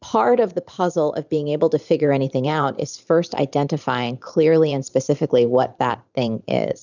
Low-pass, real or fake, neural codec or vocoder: 7.2 kHz; fake; vocoder, 44.1 kHz, 128 mel bands every 512 samples, BigVGAN v2